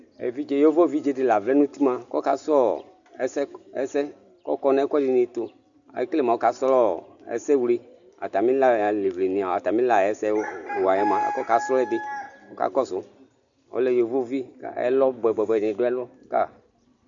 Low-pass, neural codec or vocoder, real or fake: 7.2 kHz; none; real